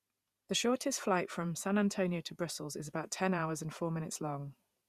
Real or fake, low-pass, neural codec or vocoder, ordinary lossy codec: fake; 14.4 kHz; vocoder, 48 kHz, 128 mel bands, Vocos; Opus, 64 kbps